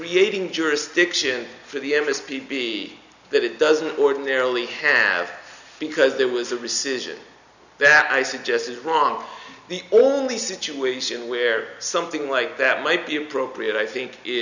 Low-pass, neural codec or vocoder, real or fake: 7.2 kHz; none; real